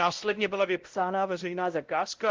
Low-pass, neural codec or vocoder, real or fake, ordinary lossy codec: 7.2 kHz; codec, 16 kHz, 0.5 kbps, X-Codec, WavLM features, trained on Multilingual LibriSpeech; fake; Opus, 16 kbps